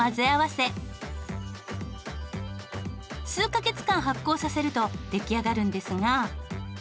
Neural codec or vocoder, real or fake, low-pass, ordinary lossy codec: none; real; none; none